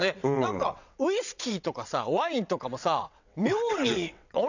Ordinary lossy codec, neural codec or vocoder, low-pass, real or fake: none; vocoder, 22.05 kHz, 80 mel bands, WaveNeXt; 7.2 kHz; fake